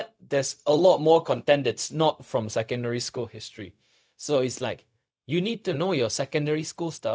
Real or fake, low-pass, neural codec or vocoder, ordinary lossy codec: fake; none; codec, 16 kHz, 0.4 kbps, LongCat-Audio-Codec; none